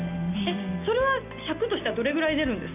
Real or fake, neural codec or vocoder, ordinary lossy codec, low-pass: real; none; none; 3.6 kHz